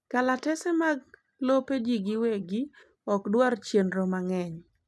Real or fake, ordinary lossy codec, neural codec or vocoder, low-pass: real; none; none; none